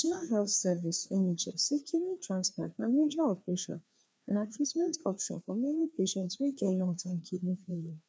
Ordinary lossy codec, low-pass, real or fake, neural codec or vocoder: none; none; fake; codec, 16 kHz, 2 kbps, FreqCodec, larger model